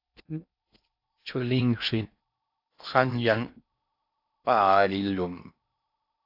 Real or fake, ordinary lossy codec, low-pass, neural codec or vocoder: fake; AAC, 48 kbps; 5.4 kHz; codec, 16 kHz in and 24 kHz out, 0.6 kbps, FocalCodec, streaming, 4096 codes